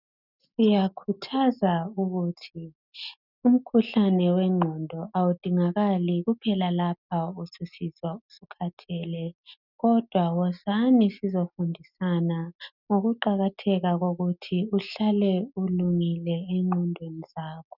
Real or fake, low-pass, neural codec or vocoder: real; 5.4 kHz; none